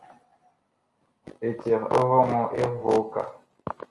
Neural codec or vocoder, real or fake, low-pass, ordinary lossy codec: none; real; 10.8 kHz; Opus, 32 kbps